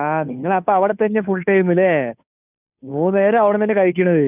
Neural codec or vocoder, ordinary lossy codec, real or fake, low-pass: codec, 16 kHz, 2 kbps, FunCodec, trained on Chinese and English, 25 frames a second; none; fake; 3.6 kHz